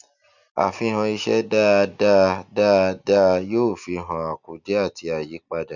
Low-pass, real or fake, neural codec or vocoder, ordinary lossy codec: 7.2 kHz; real; none; none